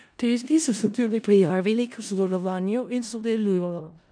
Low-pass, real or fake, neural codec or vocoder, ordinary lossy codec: 9.9 kHz; fake; codec, 16 kHz in and 24 kHz out, 0.4 kbps, LongCat-Audio-Codec, four codebook decoder; none